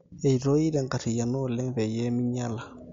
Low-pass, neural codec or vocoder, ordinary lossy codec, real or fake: 7.2 kHz; none; MP3, 48 kbps; real